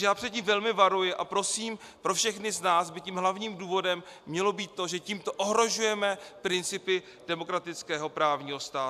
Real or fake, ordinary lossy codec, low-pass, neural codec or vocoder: real; AAC, 96 kbps; 14.4 kHz; none